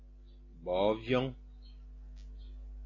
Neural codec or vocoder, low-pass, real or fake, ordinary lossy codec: none; 7.2 kHz; real; AAC, 32 kbps